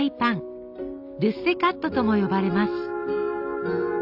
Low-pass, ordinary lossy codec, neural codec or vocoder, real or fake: 5.4 kHz; AAC, 48 kbps; none; real